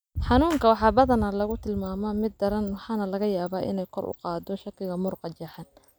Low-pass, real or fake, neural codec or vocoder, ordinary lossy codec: none; real; none; none